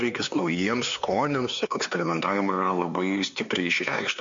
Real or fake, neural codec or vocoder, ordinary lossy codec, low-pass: fake; codec, 16 kHz, 4 kbps, X-Codec, HuBERT features, trained on LibriSpeech; MP3, 48 kbps; 7.2 kHz